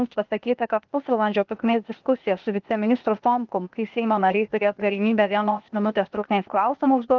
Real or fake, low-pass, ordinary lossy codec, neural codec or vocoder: fake; 7.2 kHz; Opus, 32 kbps; codec, 16 kHz, 0.8 kbps, ZipCodec